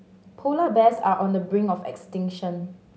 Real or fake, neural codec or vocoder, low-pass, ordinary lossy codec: real; none; none; none